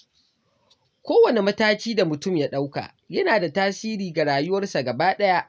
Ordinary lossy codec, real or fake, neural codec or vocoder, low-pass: none; real; none; none